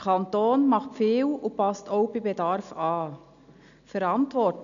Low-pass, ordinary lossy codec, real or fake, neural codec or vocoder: 7.2 kHz; none; real; none